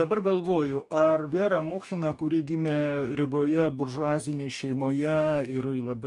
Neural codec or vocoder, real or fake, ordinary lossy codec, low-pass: codec, 44.1 kHz, 2.6 kbps, DAC; fake; MP3, 96 kbps; 10.8 kHz